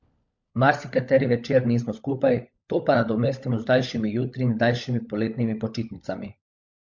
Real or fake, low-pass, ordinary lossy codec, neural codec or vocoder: fake; 7.2 kHz; MP3, 48 kbps; codec, 16 kHz, 16 kbps, FunCodec, trained on LibriTTS, 50 frames a second